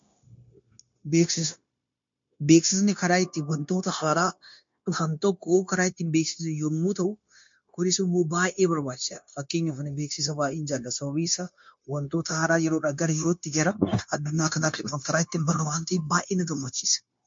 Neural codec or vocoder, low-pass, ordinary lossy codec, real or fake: codec, 16 kHz, 0.9 kbps, LongCat-Audio-Codec; 7.2 kHz; MP3, 48 kbps; fake